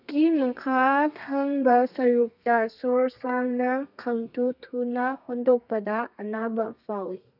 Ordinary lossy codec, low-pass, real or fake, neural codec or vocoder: none; 5.4 kHz; fake; codec, 32 kHz, 1.9 kbps, SNAC